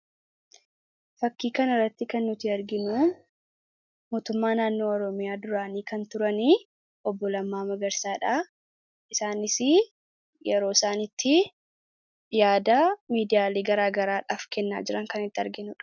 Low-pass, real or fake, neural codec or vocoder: 7.2 kHz; real; none